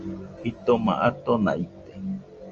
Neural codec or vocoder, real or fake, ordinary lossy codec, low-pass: none; real; Opus, 24 kbps; 7.2 kHz